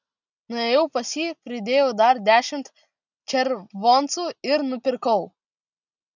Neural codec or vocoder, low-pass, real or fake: none; 7.2 kHz; real